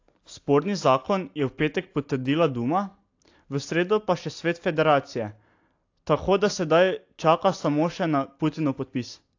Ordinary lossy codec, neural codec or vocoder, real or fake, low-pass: AAC, 48 kbps; none; real; 7.2 kHz